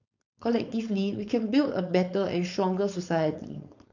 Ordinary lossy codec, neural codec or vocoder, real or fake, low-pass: none; codec, 16 kHz, 4.8 kbps, FACodec; fake; 7.2 kHz